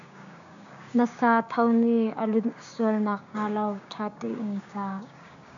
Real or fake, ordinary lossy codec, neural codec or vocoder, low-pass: fake; AAC, 64 kbps; codec, 16 kHz, 6 kbps, DAC; 7.2 kHz